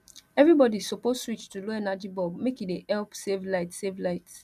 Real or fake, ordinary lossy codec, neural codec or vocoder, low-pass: real; none; none; 14.4 kHz